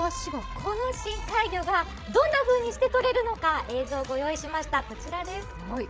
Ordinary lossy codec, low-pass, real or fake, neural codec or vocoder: none; none; fake; codec, 16 kHz, 16 kbps, FreqCodec, larger model